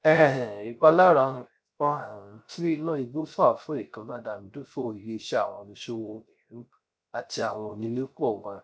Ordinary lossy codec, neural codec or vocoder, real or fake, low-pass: none; codec, 16 kHz, 0.3 kbps, FocalCodec; fake; none